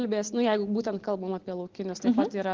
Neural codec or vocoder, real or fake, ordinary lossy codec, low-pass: none; real; Opus, 16 kbps; 7.2 kHz